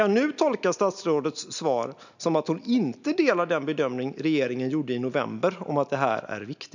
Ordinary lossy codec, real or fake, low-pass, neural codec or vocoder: none; real; 7.2 kHz; none